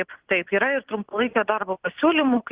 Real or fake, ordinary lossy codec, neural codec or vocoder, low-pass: real; Opus, 16 kbps; none; 3.6 kHz